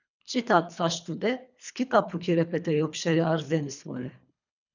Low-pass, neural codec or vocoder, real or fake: 7.2 kHz; codec, 24 kHz, 3 kbps, HILCodec; fake